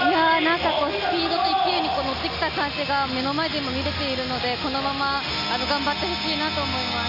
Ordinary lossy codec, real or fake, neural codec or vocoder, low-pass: none; real; none; 5.4 kHz